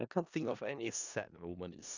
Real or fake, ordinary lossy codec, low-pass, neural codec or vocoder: fake; Opus, 64 kbps; 7.2 kHz; codec, 16 kHz in and 24 kHz out, 0.4 kbps, LongCat-Audio-Codec, four codebook decoder